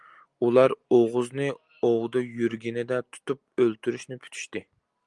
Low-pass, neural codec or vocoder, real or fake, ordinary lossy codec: 10.8 kHz; none; real; Opus, 32 kbps